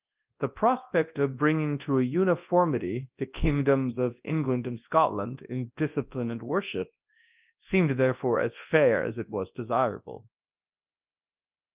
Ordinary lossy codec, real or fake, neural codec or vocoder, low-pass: Opus, 24 kbps; fake; codec, 24 kHz, 0.9 kbps, WavTokenizer, large speech release; 3.6 kHz